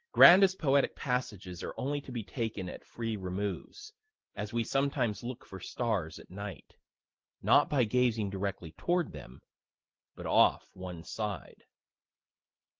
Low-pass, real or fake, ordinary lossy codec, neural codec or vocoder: 7.2 kHz; real; Opus, 16 kbps; none